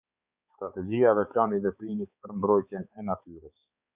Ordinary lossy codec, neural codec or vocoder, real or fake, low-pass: Opus, 64 kbps; codec, 16 kHz, 4 kbps, X-Codec, HuBERT features, trained on balanced general audio; fake; 3.6 kHz